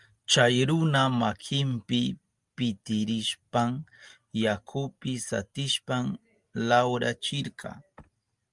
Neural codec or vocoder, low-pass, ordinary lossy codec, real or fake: none; 10.8 kHz; Opus, 32 kbps; real